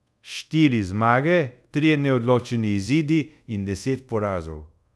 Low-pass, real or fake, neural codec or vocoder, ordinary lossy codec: none; fake; codec, 24 kHz, 0.5 kbps, DualCodec; none